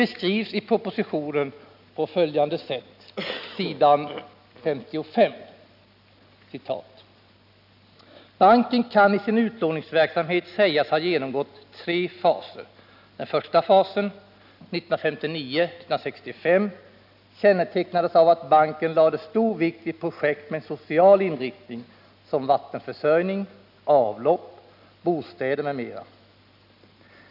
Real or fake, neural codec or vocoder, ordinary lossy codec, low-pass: real; none; none; 5.4 kHz